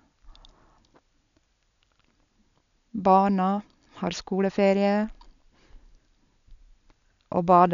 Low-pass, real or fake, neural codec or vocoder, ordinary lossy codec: 7.2 kHz; real; none; none